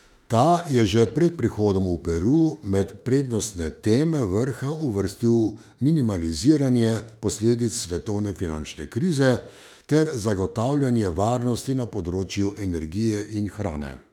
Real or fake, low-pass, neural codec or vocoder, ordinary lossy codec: fake; 19.8 kHz; autoencoder, 48 kHz, 32 numbers a frame, DAC-VAE, trained on Japanese speech; none